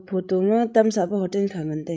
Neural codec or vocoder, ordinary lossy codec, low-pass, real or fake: codec, 16 kHz, 16 kbps, FreqCodec, larger model; none; none; fake